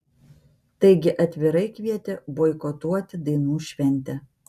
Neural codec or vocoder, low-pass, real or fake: none; 14.4 kHz; real